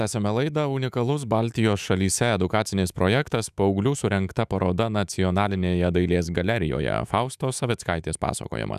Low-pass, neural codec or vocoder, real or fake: 14.4 kHz; autoencoder, 48 kHz, 128 numbers a frame, DAC-VAE, trained on Japanese speech; fake